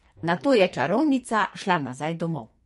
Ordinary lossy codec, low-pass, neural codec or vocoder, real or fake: MP3, 48 kbps; 14.4 kHz; codec, 44.1 kHz, 2.6 kbps, SNAC; fake